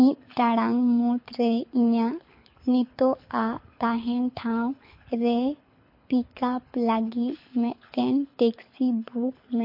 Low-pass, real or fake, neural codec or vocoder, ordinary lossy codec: 5.4 kHz; fake; codec, 24 kHz, 6 kbps, HILCodec; MP3, 32 kbps